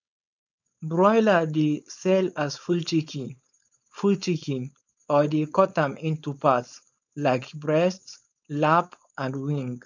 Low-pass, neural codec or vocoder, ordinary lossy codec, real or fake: 7.2 kHz; codec, 16 kHz, 4.8 kbps, FACodec; none; fake